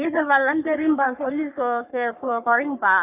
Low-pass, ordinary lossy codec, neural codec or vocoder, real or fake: 3.6 kHz; none; codec, 44.1 kHz, 3.4 kbps, Pupu-Codec; fake